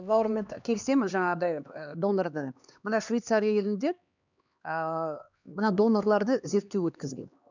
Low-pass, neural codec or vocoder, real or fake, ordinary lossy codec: 7.2 kHz; codec, 16 kHz, 2 kbps, X-Codec, HuBERT features, trained on LibriSpeech; fake; none